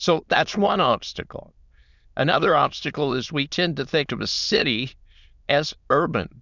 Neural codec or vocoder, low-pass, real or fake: autoencoder, 22.05 kHz, a latent of 192 numbers a frame, VITS, trained on many speakers; 7.2 kHz; fake